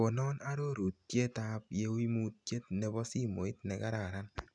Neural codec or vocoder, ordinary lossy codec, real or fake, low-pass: none; none; real; 9.9 kHz